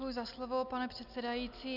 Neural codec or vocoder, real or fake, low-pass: none; real; 5.4 kHz